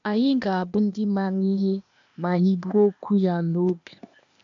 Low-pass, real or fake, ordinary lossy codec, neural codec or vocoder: 7.2 kHz; fake; MP3, 64 kbps; codec, 16 kHz, 0.8 kbps, ZipCodec